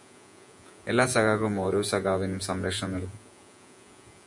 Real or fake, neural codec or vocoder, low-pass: fake; vocoder, 48 kHz, 128 mel bands, Vocos; 10.8 kHz